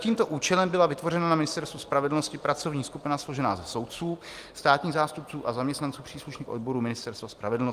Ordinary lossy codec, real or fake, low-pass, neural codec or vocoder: Opus, 32 kbps; real; 14.4 kHz; none